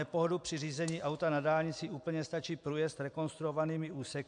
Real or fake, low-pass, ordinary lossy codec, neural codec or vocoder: real; 9.9 kHz; MP3, 64 kbps; none